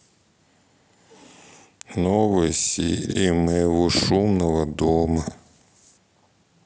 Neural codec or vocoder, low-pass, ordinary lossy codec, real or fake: none; none; none; real